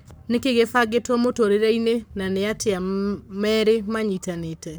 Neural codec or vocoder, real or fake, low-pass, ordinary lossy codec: codec, 44.1 kHz, 7.8 kbps, Pupu-Codec; fake; none; none